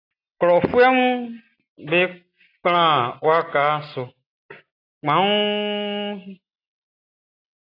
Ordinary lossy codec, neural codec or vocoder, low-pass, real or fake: AAC, 32 kbps; none; 5.4 kHz; real